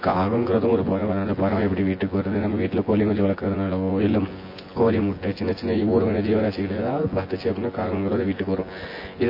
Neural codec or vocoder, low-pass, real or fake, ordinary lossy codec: vocoder, 24 kHz, 100 mel bands, Vocos; 5.4 kHz; fake; MP3, 24 kbps